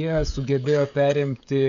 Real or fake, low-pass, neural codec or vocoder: fake; 7.2 kHz; codec, 16 kHz, 16 kbps, FreqCodec, smaller model